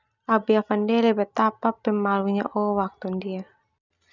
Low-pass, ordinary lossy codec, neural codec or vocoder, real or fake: 7.2 kHz; none; none; real